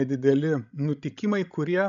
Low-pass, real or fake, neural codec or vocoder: 7.2 kHz; fake; codec, 16 kHz, 16 kbps, FreqCodec, larger model